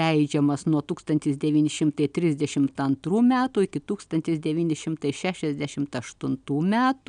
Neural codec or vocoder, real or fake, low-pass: none; real; 9.9 kHz